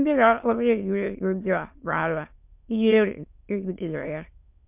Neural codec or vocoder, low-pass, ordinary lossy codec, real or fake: autoencoder, 22.05 kHz, a latent of 192 numbers a frame, VITS, trained on many speakers; 3.6 kHz; MP3, 32 kbps; fake